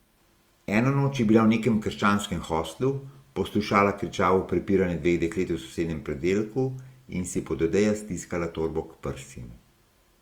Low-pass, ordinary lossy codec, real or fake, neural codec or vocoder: 19.8 kHz; Opus, 32 kbps; real; none